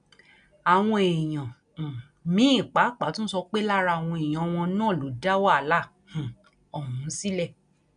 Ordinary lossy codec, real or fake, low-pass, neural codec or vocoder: none; real; 9.9 kHz; none